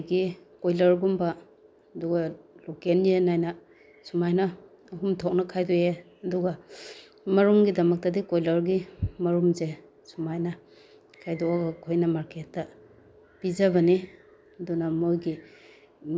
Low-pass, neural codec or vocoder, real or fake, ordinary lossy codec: none; none; real; none